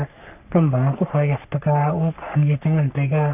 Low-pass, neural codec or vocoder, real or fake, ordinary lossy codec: 3.6 kHz; codec, 44.1 kHz, 3.4 kbps, Pupu-Codec; fake; none